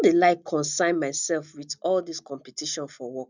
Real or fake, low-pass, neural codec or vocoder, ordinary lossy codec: real; 7.2 kHz; none; none